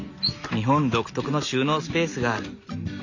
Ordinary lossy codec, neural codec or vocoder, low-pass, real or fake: none; none; 7.2 kHz; real